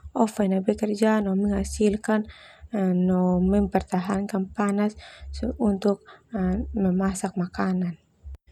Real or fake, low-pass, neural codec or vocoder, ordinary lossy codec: real; 19.8 kHz; none; none